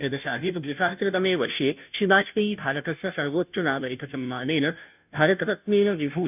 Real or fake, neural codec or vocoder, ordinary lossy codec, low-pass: fake; codec, 16 kHz, 0.5 kbps, FunCodec, trained on Chinese and English, 25 frames a second; none; 3.6 kHz